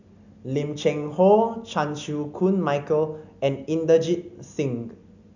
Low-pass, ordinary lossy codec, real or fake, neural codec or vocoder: 7.2 kHz; none; real; none